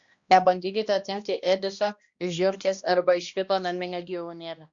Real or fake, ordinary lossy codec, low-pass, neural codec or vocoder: fake; Opus, 64 kbps; 7.2 kHz; codec, 16 kHz, 1 kbps, X-Codec, HuBERT features, trained on balanced general audio